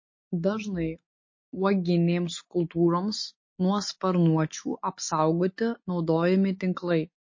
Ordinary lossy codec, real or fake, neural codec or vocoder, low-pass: MP3, 32 kbps; real; none; 7.2 kHz